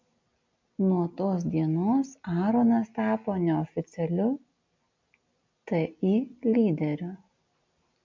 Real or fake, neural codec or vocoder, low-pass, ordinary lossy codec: real; none; 7.2 kHz; MP3, 64 kbps